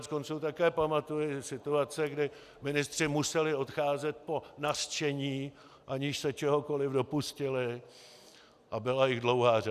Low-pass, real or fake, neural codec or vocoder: 14.4 kHz; real; none